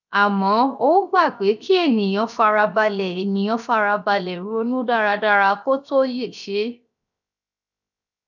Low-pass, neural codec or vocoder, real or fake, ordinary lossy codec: 7.2 kHz; codec, 16 kHz, 0.7 kbps, FocalCodec; fake; none